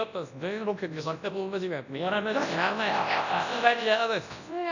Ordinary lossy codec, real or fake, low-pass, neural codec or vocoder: none; fake; 7.2 kHz; codec, 24 kHz, 0.9 kbps, WavTokenizer, large speech release